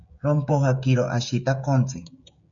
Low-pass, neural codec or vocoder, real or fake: 7.2 kHz; codec, 16 kHz, 16 kbps, FreqCodec, smaller model; fake